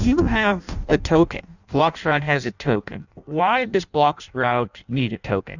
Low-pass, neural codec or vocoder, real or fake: 7.2 kHz; codec, 16 kHz in and 24 kHz out, 0.6 kbps, FireRedTTS-2 codec; fake